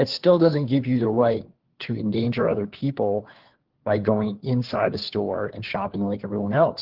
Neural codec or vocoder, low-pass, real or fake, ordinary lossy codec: codec, 16 kHz, 4 kbps, FunCodec, trained on LibriTTS, 50 frames a second; 5.4 kHz; fake; Opus, 16 kbps